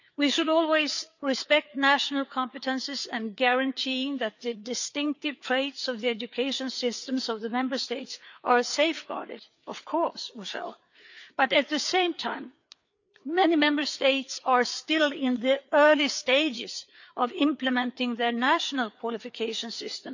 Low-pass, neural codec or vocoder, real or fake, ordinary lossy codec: 7.2 kHz; codec, 16 kHz, 4 kbps, FreqCodec, larger model; fake; none